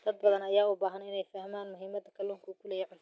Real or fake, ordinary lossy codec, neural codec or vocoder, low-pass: real; none; none; none